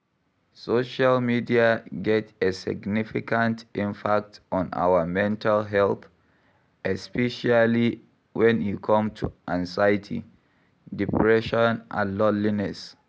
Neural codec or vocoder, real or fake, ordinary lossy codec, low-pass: none; real; none; none